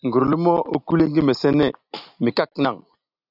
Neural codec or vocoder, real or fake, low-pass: none; real; 5.4 kHz